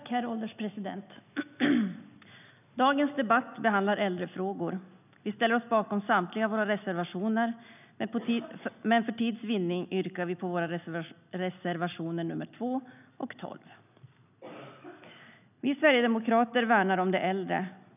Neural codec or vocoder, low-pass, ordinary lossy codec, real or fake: none; 3.6 kHz; none; real